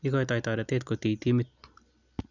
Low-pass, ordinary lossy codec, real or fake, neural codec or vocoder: 7.2 kHz; none; real; none